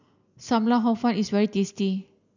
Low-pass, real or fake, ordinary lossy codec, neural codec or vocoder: 7.2 kHz; real; none; none